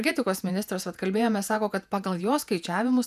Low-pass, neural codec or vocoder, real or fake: 14.4 kHz; vocoder, 48 kHz, 128 mel bands, Vocos; fake